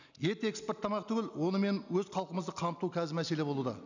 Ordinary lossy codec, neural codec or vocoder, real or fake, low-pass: none; none; real; 7.2 kHz